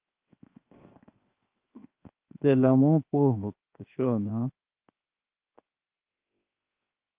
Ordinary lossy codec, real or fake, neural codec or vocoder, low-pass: Opus, 32 kbps; fake; codec, 16 kHz, 0.7 kbps, FocalCodec; 3.6 kHz